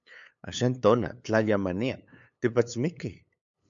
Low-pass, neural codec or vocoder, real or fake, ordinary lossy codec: 7.2 kHz; codec, 16 kHz, 8 kbps, FunCodec, trained on LibriTTS, 25 frames a second; fake; MP3, 64 kbps